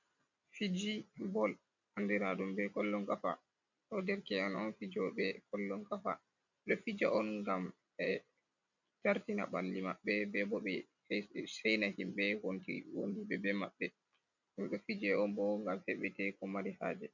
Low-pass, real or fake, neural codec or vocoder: 7.2 kHz; real; none